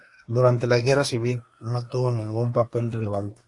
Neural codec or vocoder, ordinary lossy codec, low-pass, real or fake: codec, 24 kHz, 1 kbps, SNAC; AAC, 48 kbps; 10.8 kHz; fake